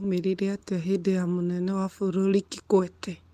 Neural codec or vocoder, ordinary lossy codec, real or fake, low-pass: none; Opus, 24 kbps; real; 14.4 kHz